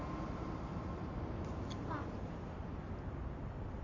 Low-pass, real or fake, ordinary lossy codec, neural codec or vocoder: 7.2 kHz; real; MP3, 48 kbps; none